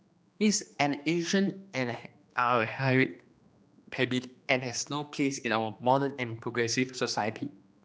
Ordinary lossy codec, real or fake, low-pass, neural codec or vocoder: none; fake; none; codec, 16 kHz, 2 kbps, X-Codec, HuBERT features, trained on general audio